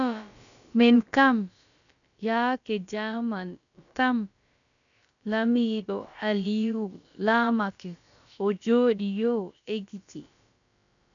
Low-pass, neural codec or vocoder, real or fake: 7.2 kHz; codec, 16 kHz, about 1 kbps, DyCAST, with the encoder's durations; fake